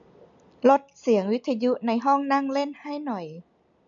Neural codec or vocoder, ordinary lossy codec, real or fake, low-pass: none; none; real; 7.2 kHz